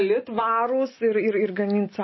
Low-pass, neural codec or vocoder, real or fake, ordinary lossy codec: 7.2 kHz; none; real; MP3, 24 kbps